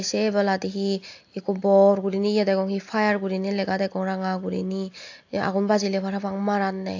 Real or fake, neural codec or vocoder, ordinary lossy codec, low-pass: real; none; AAC, 48 kbps; 7.2 kHz